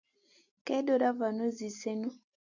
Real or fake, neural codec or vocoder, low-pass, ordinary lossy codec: real; none; 7.2 kHz; AAC, 48 kbps